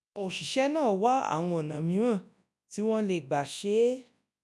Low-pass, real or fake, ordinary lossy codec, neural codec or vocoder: none; fake; none; codec, 24 kHz, 0.9 kbps, WavTokenizer, large speech release